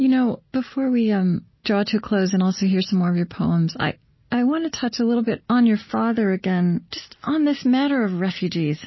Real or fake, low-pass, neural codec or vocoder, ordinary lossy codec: real; 7.2 kHz; none; MP3, 24 kbps